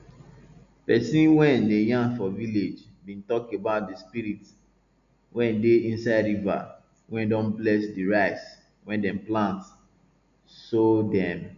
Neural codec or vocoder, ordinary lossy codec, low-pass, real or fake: none; none; 7.2 kHz; real